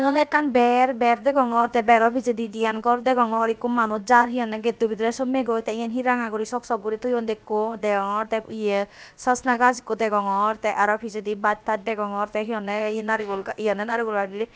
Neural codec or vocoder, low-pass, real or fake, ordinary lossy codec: codec, 16 kHz, about 1 kbps, DyCAST, with the encoder's durations; none; fake; none